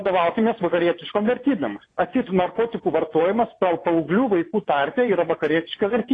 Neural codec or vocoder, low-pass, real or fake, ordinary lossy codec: vocoder, 24 kHz, 100 mel bands, Vocos; 9.9 kHz; fake; AAC, 32 kbps